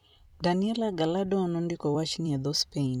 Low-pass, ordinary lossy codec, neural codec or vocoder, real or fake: 19.8 kHz; none; vocoder, 44.1 kHz, 128 mel bands every 256 samples, BigVGAN v2; fake